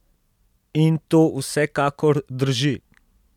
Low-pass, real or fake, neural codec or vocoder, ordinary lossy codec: 19.8 kHz; real; none; none